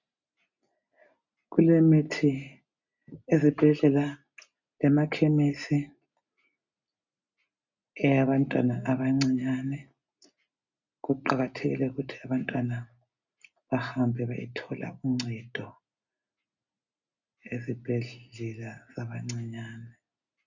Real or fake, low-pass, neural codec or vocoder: real; 7.2 kHz; none